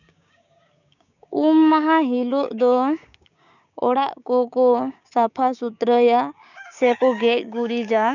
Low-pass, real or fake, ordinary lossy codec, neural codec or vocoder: 7.2 kHz; fake; none; codec, 16 kHz, 6 kbps, DAC